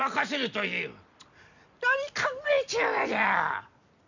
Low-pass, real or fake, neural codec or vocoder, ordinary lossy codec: 7.2 kHz; fake; vocoder, 44.1 kHz, 128 mel bands every 256 samples, BigVGAN v2; none